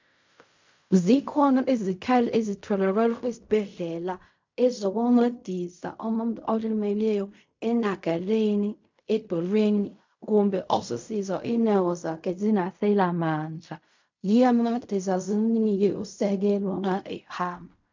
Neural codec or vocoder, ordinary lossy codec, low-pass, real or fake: codec, 16 kHz in and 24 kHz out, 0.4 kbps, LongCat-Audio-Codec, fine tuned four codebook decoder; MP3, 64 kbps; 7.2 kHz; fake